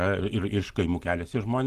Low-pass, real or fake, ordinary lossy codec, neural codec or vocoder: 14.4 kHz; real; Opus, 16 kbps; none